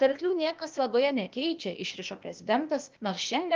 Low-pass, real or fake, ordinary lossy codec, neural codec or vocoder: 7.2 kHz; fake; Opus, 24 kbps; codec, 16 kHz, 0.8 kbps, ZipCodec